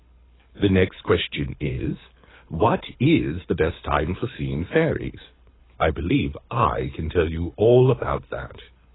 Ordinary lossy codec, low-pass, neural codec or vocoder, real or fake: AAC, 16 kbps; 7.2 kHz; codec, 24 kHz, 6 kbps, HILCodec; fake